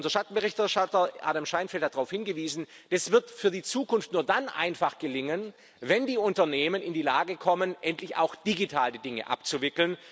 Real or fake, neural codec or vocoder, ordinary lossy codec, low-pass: real; none; none; none